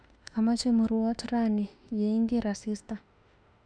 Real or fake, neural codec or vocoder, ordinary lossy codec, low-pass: fake; autoencoder, 48 kHz, 32 numbers a frame, DAC-VAE, trained on Japanese speech; none; 9.9 kHz